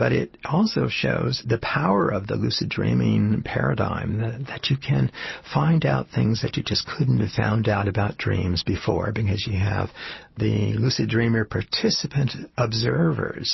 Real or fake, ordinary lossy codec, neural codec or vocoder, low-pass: real; MP3, 24 kbps; none; 7.2 kHz